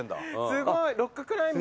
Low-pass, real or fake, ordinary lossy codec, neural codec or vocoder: none; real; none; none